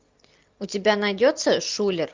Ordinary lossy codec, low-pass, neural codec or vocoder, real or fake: Opus, 24 kbps; 7.2 kHz; none; real